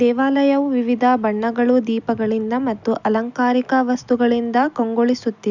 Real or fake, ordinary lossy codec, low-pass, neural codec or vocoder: real; none; 7.2 kHz; none